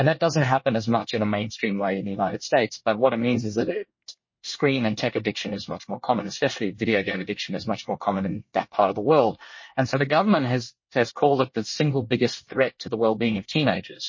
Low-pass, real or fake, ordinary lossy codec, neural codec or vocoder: 7.2 kHz; fake; MP3, 32 kbps; codec, 24 kHz, 1 kbps, SNAC